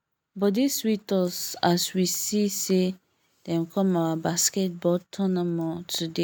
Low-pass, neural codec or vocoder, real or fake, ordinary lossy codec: none; none; real; none